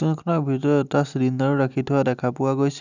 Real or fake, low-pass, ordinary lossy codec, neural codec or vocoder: real; 7.2 kHz; none; none